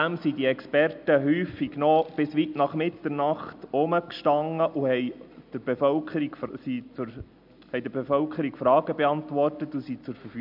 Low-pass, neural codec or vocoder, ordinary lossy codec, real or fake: 5.4 kHz; none; none; real